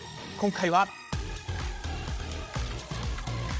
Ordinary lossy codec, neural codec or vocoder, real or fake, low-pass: none; codec, 16 kHz, 16 kbps, FreqCodec, larger model; fake; none